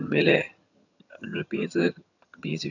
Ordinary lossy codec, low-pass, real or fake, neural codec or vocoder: none; 7.2 kHz; fake; vocoder, 22.05 kHz, 80 mel bands, HiFi-GAN